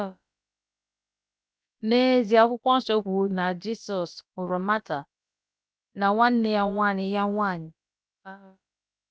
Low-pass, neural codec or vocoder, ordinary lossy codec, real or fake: none; codec, 16 kHz, about 1 kbps, DyCAST, with the encoder's durations; none; fake